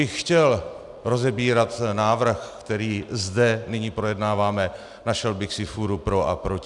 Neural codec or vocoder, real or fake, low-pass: none; real; 10.8 kHz